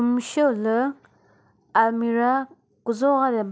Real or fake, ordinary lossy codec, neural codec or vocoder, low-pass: real; none; none; none